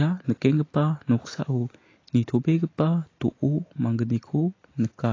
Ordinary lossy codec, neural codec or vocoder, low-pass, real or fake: AAC, 32 kbps; none; 7.2 kHz; real